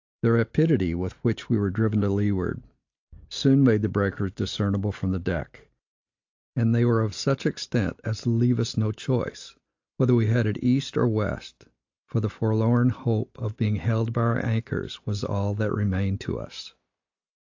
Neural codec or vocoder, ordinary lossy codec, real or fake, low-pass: none; AAC, 48 kbps; real; 7.2 kHz